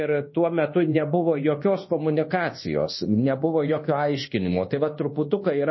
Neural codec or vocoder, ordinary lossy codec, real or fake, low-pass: codec, 24 kHz, 1.2 kbps, DualCodec; MP3, 24 kbps; fake; 7.2 kHz